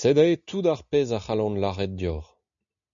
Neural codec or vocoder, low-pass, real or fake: none; 7.2 kHz; real